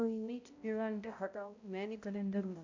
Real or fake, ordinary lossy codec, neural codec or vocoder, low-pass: fake; AAC, 48 kbps; codec, 16 kHz, 0.5 kbps, X-Codec, HuBERT features, trained on balanced general audio; 7.2 kHz